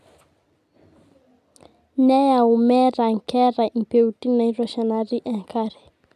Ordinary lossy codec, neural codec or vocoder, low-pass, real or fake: none; none; 14.4 kHz; real